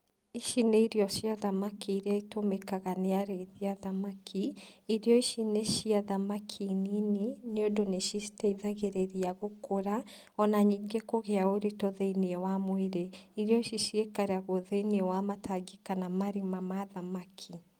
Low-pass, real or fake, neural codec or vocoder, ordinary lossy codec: 19.8 kHz; real; none; Opus, 32 kbps